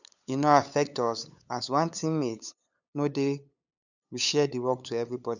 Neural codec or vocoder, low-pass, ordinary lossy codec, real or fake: codec, 16 kHz, 8 kbps, FunCodec, trained on LibriTTS, 25 frames a second; 7.2 kHz; none; fake